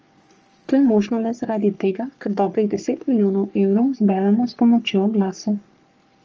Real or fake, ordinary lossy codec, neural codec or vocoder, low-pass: fake; Opus, 24 kbps; codec, 44.1 kHz, 3.4 kbps, Pupu-Codec; 7.2 kHz